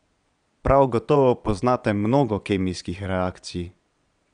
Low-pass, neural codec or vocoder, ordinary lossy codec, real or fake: 9.9 kHz; vocoder, 22.05 kHz, 80 mel bands, Vocos; none; fake